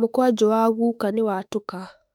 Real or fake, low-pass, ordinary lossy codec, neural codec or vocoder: fake; 19.8 kHz; none; autoencoder, 48 kHz, 32 numbers a frame, DAC-VAE, trained on Japanese speech